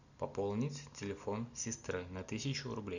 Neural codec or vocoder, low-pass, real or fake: none; 7.2 kHz; real